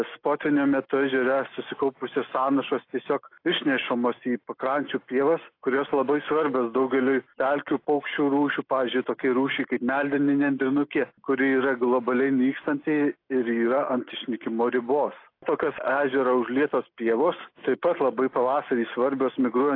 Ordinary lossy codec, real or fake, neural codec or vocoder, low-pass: AAC, 32 kbps; real; none; 5.4 kHz